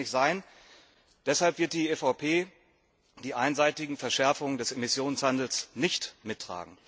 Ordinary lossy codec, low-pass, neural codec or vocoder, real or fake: none; none; none; real